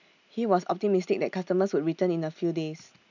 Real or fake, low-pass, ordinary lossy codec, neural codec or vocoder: real; 7.2 kHz; none; none